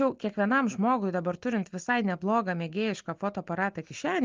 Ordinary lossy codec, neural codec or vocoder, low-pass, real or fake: Opus, 24 kbps; none; 7.2 kHz; real